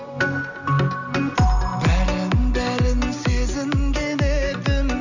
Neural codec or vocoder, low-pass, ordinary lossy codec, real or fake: none; 7.2 kHz; none; real